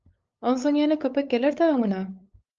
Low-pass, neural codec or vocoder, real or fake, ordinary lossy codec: 7.2 kHz; codec, 16 kHz, 8 kbps, FunCodec, trained on LibriTTS, 25 frames a second; fake; Opus, 24 kbps